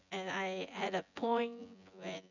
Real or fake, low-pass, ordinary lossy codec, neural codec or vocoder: fake; 7.2 kHz; none; vocoder, 24 kHz, 100 mel bands, Vocos